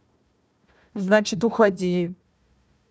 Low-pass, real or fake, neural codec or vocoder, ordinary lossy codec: none; fake; codec, 16 kHz, 1 kbps, FunCodec, trained on Chinese and English, 50 frames a second; none